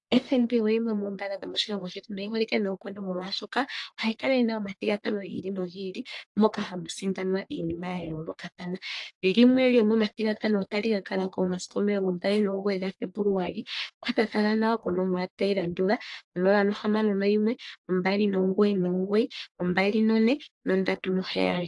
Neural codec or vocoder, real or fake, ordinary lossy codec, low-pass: codec, 44.1 kHz, 1.7 kbps, Pupu-Codec; fake; AAC, 64 kbps; 10.8 kHz